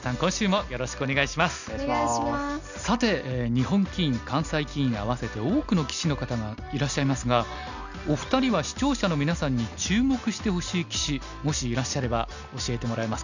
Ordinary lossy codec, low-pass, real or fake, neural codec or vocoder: none; 7.2 kHz; real; none